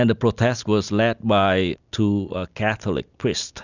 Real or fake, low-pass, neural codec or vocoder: real; 7.2 kHz; none